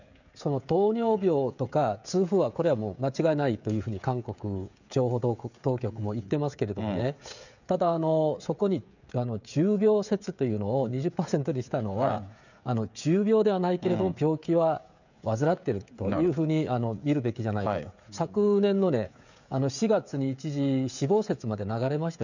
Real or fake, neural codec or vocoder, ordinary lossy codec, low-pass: fake; codec, 16 kHz, 16 kbps, FreqCodec, smaller model; none; 7.2 kHz